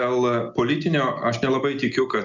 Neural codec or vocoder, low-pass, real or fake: none; 7.2 kHz; real